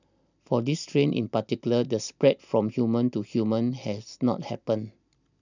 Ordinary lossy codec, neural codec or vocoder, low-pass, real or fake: none; none; 7.2 kHz; real